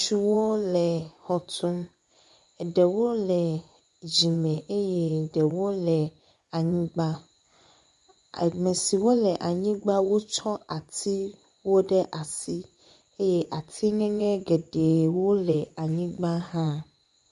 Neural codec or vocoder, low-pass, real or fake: vocoder, 24 kHz, 100 mel bands, Vocos; 10.8 kHz; fake